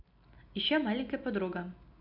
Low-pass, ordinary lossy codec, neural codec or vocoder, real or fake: 5.4 kHz; AAC, 48 kbps; none; real